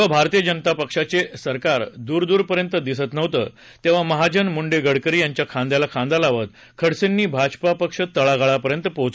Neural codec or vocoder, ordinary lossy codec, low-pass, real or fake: none; none; none; real